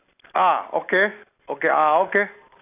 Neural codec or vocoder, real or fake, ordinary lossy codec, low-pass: none; real; AAC, 32 kbps; 3.6 kHz